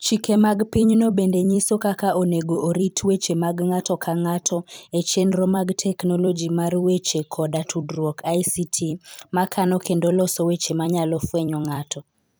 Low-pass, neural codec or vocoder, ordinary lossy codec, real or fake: none; vocoder, 44.1 kHz, 128 mel bands every 256 samples, BigVGAN v2; none; fake